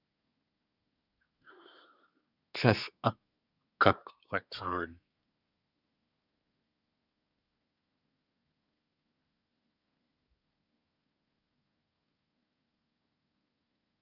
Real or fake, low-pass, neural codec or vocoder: fake; 5.4 kHz; codec, 24 kHz, 1 kbps, SNAC